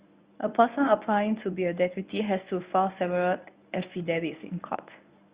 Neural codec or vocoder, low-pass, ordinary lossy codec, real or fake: codec, 24 kHz, 0.9 kbps, WavTokenizer, medium speech release version 1; 3.6 kHz; Opus, 64 kbps; fake